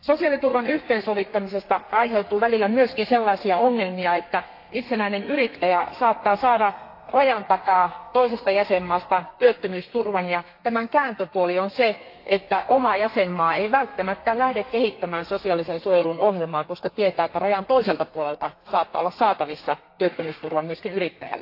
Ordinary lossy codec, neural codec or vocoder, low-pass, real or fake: AAC, 32 kbps; codec, 32 kHz, 1.9 kbps, SNAC; 5.4 kHz; fake